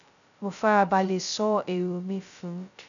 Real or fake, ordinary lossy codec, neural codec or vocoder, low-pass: fake; none; codec, 16 kHz, 0.2 kbps, FocalCodec; 7.2 kHz